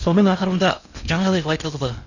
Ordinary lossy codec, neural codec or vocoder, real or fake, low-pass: none; codec, 16 kHz in and 24 kHz out, 0.6 kbps, FocalCodec, streaming, 4096 codes; fake; 7.2 kHz